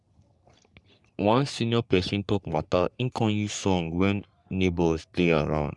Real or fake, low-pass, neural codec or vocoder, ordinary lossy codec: fake; 10.8 kHz; codec, 44.1 kHz, 3.4 kbps, Pupu-Codec; none